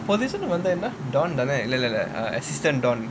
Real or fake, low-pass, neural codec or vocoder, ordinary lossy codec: real; none; none; none